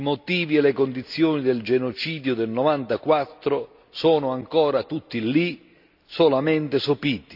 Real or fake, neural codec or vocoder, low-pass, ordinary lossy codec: real; none; 5.4 kHz; none